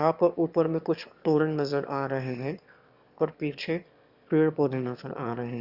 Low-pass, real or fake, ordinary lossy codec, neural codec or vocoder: 5.4 kHz; fake; Opus, 64 kbps; autoencoder, 22.05 kHz, a latent of 192 numbers a frame, VITS, trained on one speaker